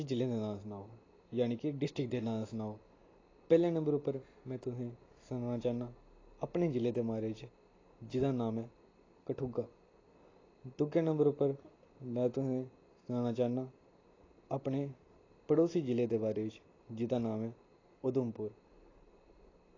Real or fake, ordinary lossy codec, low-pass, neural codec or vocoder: real; AAC, 32 kbps; 7.2 kHz; none